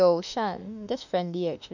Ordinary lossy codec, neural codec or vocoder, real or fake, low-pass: none; autoencoder, 48 kHz, 32 numbers a frame, DAC-VAE, trained on Japanese speech; fake; 7.2 kHz